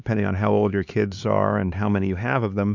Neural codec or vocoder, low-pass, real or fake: codec, 16 kHz, 4 kbps, X-Codec, HuBERT features, trained on LibriSpeech; 7.2 kHz; fake